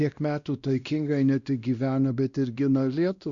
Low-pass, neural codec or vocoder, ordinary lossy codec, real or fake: 7.2 kHz; codec, 16 kHz, 1 kbps, X-Codec, WavLM features, trained on Multilingual LibriSpeech; Opus, 64 kbps; fake